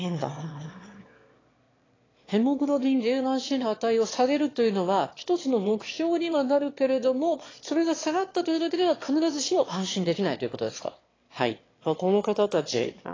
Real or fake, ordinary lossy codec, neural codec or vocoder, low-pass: fake; AAC, 32 kbps; autoencoder, 22.05 kHz, a latent of 192 numbers a frame, VITS, trained on one speaker; 7.2 kHz